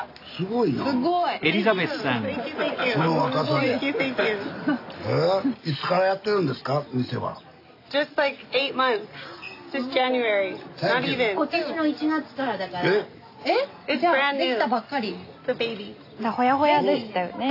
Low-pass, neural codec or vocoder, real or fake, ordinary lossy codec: 5.4 kHz; none; real; AAC, 32 kbps